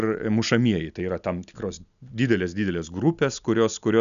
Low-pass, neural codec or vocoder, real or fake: 7.2 kHz; none; real